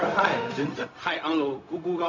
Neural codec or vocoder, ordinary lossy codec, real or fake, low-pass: codec, 16 kHz, 0.4 kbps, LongCat-Audio-Codec; none; fake; 7.2 kHz